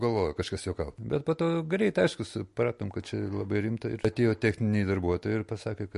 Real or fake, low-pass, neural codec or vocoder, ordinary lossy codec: real; 14.4 kHz; none; MP3, 48 kbps